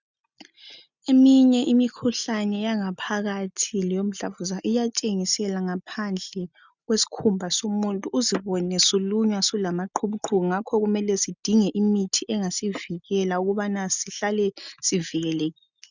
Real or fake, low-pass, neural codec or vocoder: real; 7.2 kHz; none